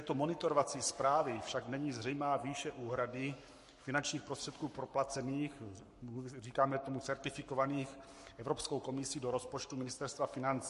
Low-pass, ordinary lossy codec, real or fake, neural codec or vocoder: 14.4 kHz; MP3, 48 kbps; fake; codec, 44.1 kHz, 7.8 kbps, Pupu-Codec